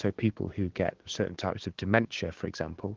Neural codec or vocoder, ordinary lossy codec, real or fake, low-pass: codec, 16 kHz, 6 kbps, DAC; Opus, 16 kbps; fake; 7.2 kHz